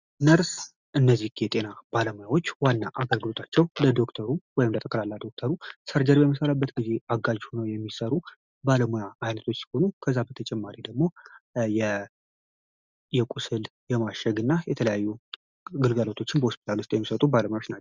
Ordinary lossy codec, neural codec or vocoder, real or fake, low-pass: Opus, 64 kbps; none; real; 7.2 kHz